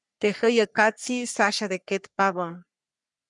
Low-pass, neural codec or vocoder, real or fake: 10.8 kHz; codec, 44.1 kHz, 3.4 kbps, Pupu-Codec; fake